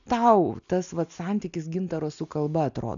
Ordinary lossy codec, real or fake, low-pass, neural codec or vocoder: MP3, 96 kbps; real; 7.2 kHz; none